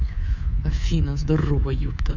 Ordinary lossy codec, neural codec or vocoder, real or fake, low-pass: none; codec, 24 kHz, 3.1 kbps, DualCodec; fake; 7.2 kHz